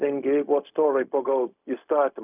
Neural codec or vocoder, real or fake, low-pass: codec, 16 kHz, 0.4 kbps, LongCat-Audio-Codec; fake; 3.6 kHz